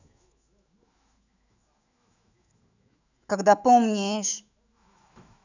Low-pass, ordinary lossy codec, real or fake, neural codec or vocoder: 7.2 kHz; none; fake; autoencoder, 48 kHz, 128 numbers a frame, DAC-VAE, trained on Japanese speech